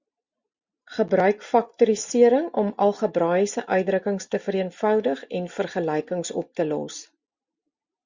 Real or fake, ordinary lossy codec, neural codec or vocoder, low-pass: real; Opus, 64 kbps; none; 7.2 kHz